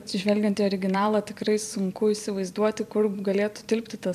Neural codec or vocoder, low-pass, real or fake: vocoder, 44.1 kHz, 128 mel bands every 256 samples, BigVGAN v2; 14.4 kHz; fake